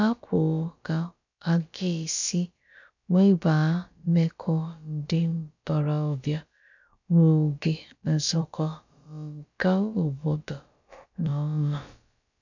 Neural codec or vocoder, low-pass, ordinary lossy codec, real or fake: codec, 16 kHz, about 1 kbps, DyCAST, with the encoder's durations; 7.2 kHz; none; fake